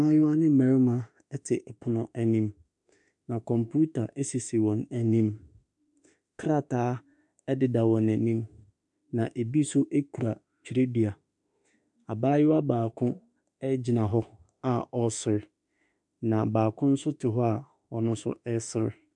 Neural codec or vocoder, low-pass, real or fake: autoencoder, 48 kHz, 32 numbers a frame, DAC-VAE, trained on Japanese speech; 10.8 kHz; fake